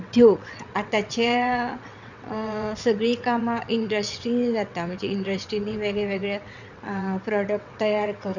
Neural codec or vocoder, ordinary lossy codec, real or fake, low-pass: vocoder, 22.05 kHz, 80 mel bands, WaveNeXt; none; fake; 7.2 kHz